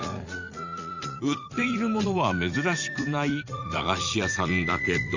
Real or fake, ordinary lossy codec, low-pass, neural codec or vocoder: fake; Opus, 64 kbps; 7.2 kHz; vocoder, 44.1 kHz, 80 mel bands, Vocos